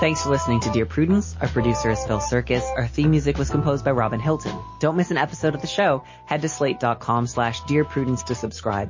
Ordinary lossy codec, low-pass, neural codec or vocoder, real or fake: MP3, 32 kbps; 7.2 kHz; none; real